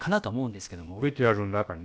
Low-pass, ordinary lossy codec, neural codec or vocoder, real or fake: none; none; codec, 16 kHz, about 1 kbps, DyCAST, with the encoder's durations; fake